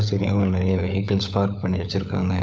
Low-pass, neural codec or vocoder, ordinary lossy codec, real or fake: none; codec, 16 kHz, 16 kbps, FunCodec, trained on LibriTTS, 50 frames a second; none; fake